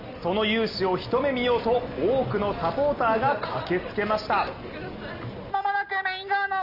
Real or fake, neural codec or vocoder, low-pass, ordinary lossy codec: real; none; 5.4 kHz; none